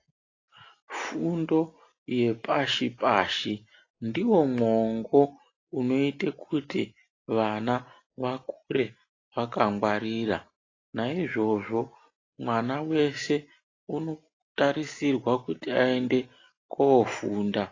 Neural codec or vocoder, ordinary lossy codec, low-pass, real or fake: none; AAC, 32 kbps; 7.2 kHz; real